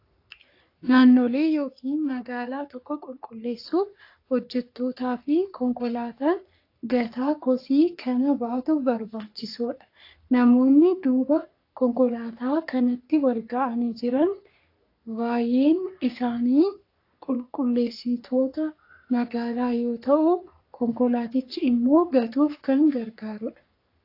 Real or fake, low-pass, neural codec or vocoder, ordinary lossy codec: fake; 5.4 kHz; codec, 44.1 kHz, 3.4 kbps, Pupu-Codec; AAC, 32 kbps